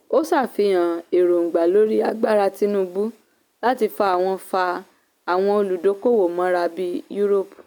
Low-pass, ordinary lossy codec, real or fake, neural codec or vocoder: none; none; real; none